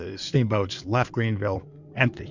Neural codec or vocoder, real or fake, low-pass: codec, 16 kHz in and 24 kHz out, 2.2 kbps, FireRedTTS-2 codec; fake; 7.2 kHz